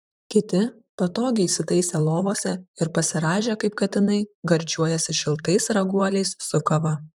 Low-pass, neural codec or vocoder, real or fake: 19.8 kHz; vocoder, 44.1 kHz, 128 mel bands, Pupu-Vocoder; fake